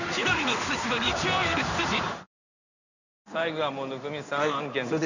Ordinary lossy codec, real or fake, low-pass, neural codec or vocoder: none; fake; 7.2 kHz; codec, 16 kHz in and 24 kHz out, 1 kbps, XY-Tokenizer